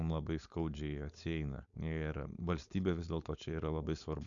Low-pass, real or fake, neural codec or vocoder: 7.2 kHz; fake; codec, 16 kHz, 4.8 kbps, FACodec